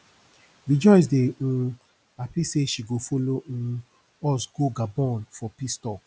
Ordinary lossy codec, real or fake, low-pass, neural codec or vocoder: none; real; none; none